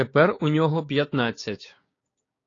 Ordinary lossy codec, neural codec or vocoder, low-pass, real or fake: AAC, 32 kbps; codec, 16 kHz, 6 kbps, DAC; 7.2 kHz; fake